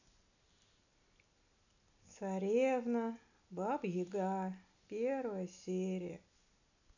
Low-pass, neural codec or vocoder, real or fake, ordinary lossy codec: 7.2 kHz; none; real; none